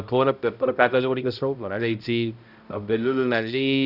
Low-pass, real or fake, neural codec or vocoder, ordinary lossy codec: 5.4 kHz; fake; codec, 16 kHz, 0.5 kbps, X-Codec, HuBERT features, trained on balanced general audio; none